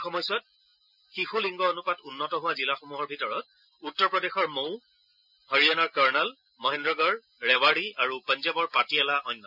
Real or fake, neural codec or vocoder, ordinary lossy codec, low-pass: real; none; none; 5.4 kHz